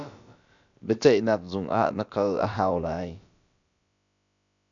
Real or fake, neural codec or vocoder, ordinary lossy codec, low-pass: fake; codec, 16 kHz, about 1 kbps, DyCAST, with the encoder's durations; MP3, 96 kbps; 7.2 kHz